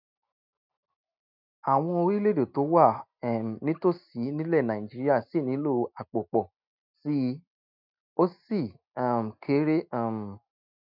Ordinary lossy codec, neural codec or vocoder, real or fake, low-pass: none; none; real; 5.4 kHz